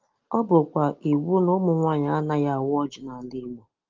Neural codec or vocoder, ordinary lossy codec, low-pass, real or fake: none; Opus, 32 kbps; 7.2 kHz; real